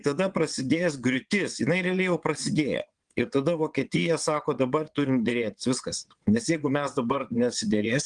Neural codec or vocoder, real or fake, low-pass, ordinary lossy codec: vocoder, 22.05 kHz, 80 mel bands, WaveNeXt; fake; 9.9 kHz; Opus, 24 kbps